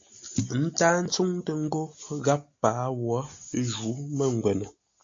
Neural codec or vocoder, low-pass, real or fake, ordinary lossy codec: none; 7.2 kHz; real; AAC, 48 kbps